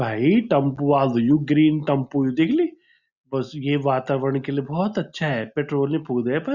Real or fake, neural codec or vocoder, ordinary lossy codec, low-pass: real; none; Opus, 64 kbps; 7.2 kHz